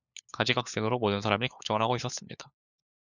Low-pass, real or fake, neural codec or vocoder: 7.2 kHz; fake; codec, 16 kHz, 4 kbps, FunCodec, trained on LibriTTS, 50 frames a second